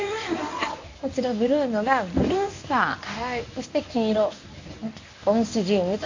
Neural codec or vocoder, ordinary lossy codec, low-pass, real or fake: codec, 24 kHz, 0.9 kbps, WavTokenizer, medium speech release version 1; AAC, 48 kbps; 7.2 kHz; fake